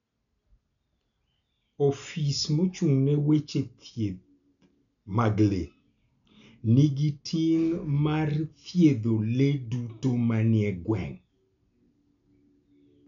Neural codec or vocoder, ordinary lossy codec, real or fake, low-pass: none; none; real; 7.2 kHz